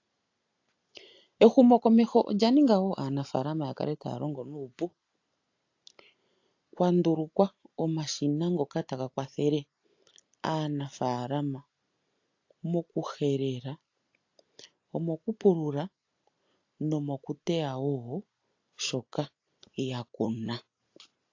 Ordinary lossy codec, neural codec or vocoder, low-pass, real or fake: AAC, 48 kbps; none; 7.2 kHz; real